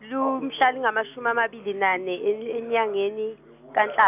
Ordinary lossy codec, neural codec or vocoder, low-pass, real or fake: none; none; 3.6 kHz; real